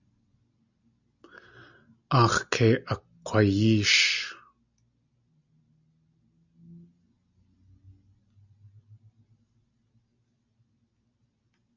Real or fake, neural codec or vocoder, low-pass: real; none; 7.2 kHz